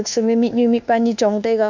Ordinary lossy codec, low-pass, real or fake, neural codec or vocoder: none; 7.2 kHz; fake; codec, 24 kHz, 1.2 kbps, DualCodec